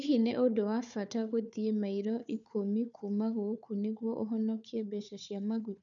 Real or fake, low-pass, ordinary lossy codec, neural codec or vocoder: fake; 7.2 kHz; none; codec, 16 kHz, 4 kbps, FunCodec, trained on Chinese and English, 50 frames a second